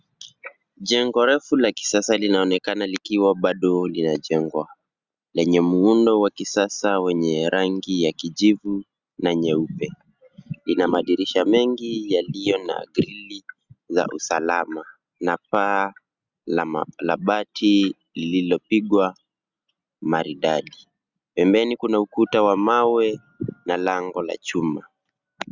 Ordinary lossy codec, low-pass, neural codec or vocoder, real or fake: Opus, 64 kbps; 7.2 kHz; none; real